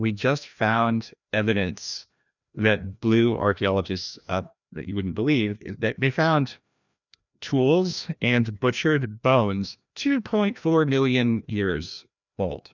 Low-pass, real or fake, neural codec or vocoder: 7.2 kHz; fake; codec, 16 kHz, 1 kbps, FreqCodec, larger model